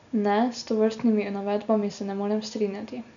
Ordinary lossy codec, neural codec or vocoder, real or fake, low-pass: none; none; real; 7.2 kHz